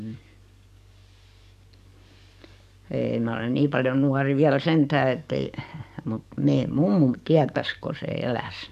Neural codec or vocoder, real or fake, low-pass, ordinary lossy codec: codec, 44.1 kHz, 7.8 kbps, DAC; fake; 14.4 kHz; none